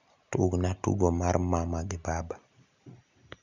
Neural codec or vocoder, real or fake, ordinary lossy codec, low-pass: none; real; none; 7.2 kHz